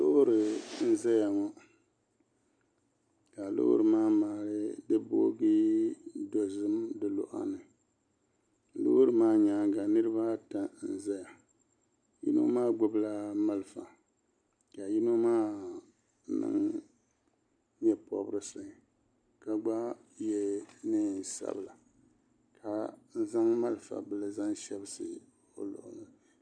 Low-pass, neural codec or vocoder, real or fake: 9.9 kHz; none; real